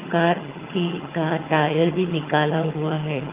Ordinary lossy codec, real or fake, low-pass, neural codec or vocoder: Opus, 24 kbps; fake; 3.6 kHz; vocoder, 22.05 kHz, 80 mel bands, HiFi-GAN